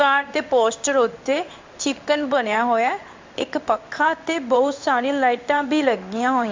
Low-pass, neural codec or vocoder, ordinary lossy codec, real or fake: 7.2 kHz; codec, 16 kHz in and 24 kHz out, 1 kbps, XY-Tokenizer; MP3, 64 kbps; fake